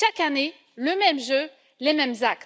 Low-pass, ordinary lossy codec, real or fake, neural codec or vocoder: none; none; real; none